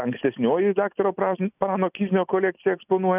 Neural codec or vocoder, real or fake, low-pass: none; real; 3.6 kHz